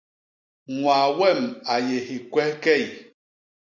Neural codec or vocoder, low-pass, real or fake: none; 7.2 kHz; real